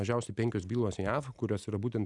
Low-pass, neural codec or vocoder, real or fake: 10.8 kHz; none; real